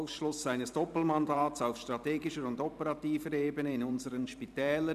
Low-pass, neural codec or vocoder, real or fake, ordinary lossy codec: 14.4 kHz; vocoder, 44.1 kHz, 128 mel bands every 512 samples, BigVGAN v2; fake; none